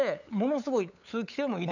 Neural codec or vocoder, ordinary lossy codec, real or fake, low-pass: codec, 16 kHz, 8 kbps, FunCodec, trained on LibriTTS, 25 frames a second; none; fake; 7.2 kHz